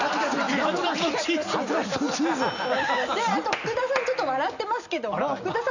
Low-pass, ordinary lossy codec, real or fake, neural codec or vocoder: 7.2 kHz; none; real; none